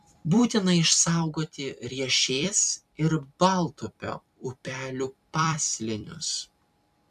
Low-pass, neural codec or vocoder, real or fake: 14.4 kHz; vocoder, 44.1 kHz, 128 mel bands every 256 samples, BigVGAN v2; fake